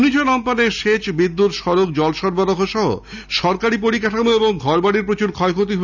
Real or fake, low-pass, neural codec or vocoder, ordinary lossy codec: real; 7.2 kHz; none; none